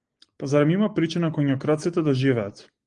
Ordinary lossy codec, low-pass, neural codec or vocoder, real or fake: Opus, 24 kbps; 9.9 kHz; none; real